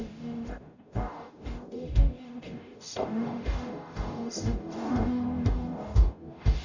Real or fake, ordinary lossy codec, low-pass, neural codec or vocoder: fake; none; 7.2 kHz; codec, 44.1 kHz, 0.9 kbps, DAC